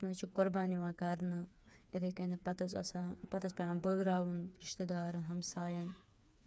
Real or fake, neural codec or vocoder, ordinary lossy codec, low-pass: fake; codec, 16 kHz, 4 kbps, FreqCodec, smaller model; none; none